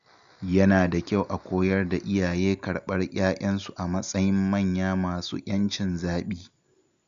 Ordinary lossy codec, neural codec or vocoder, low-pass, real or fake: Opus, 64 kbps; none; 7.2 kHz; real